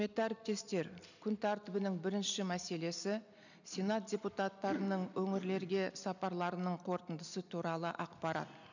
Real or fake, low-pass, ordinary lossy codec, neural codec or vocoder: real; 7.2 kHz; none; none